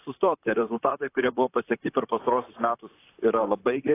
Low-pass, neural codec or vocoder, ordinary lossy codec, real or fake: 3.6 kHz; vocoder, 44.1 kHz, 128 mel bands, Pupu-Vocoder; AAC, 24 kbps; fake